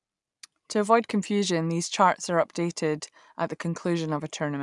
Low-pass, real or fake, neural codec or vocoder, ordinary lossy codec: 10.8 kHz; real; none; none